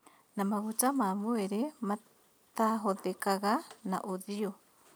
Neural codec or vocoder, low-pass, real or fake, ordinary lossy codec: none; none; real; none